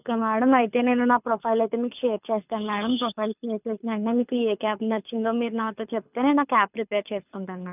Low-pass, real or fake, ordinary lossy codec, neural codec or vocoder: 3.6 kHz; fake; none; codec, 24 kHz, 6 kbps, HILCodec